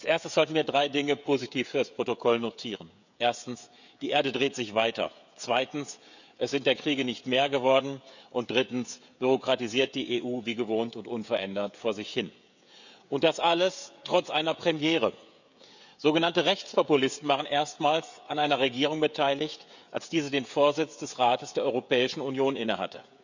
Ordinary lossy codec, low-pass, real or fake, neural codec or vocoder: none; 7.2 kHz; fake; codec, 16 kHz, 16 kbps, FreqCodec, smaller model